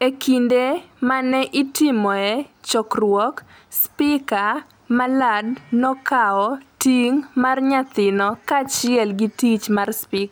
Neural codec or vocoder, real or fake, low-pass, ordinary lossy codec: none; real; none; none